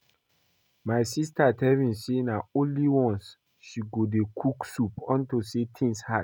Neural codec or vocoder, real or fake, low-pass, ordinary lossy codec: none; real; 19.8 kHz; none